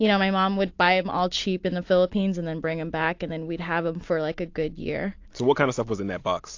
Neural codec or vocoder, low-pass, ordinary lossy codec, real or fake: none; 7.2 kHz; AAC, 48 kbps; real